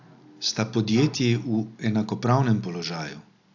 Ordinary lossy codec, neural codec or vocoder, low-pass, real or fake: AAC, 48 kbps; none; 7.2 kHz; real